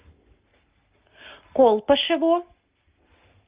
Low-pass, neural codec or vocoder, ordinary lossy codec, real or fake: 3.6 kHz; none; Opus, 24 kbps; real